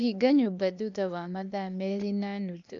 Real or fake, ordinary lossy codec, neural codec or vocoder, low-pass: fake; none; codec, 16 kHz, 0.8 kbps, ZipCodec; 7.2 kHz